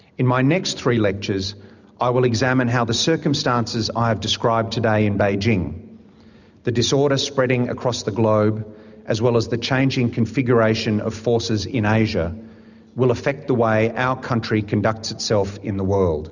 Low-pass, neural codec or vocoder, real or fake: 7.2 kHz; none; real